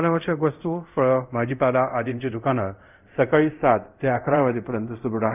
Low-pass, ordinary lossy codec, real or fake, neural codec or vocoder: 3.6 kHz; none; fake; codec, 24 kHz, 0.5 kbps, DualCodec